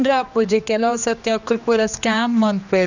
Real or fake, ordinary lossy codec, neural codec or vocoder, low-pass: fake; none; codec, 16 kHz, 2 kbps, X-Codec, HuBERT features, trained on general audio; 7.2 kHz